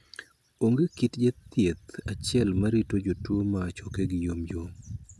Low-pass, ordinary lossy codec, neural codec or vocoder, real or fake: none; none; none; real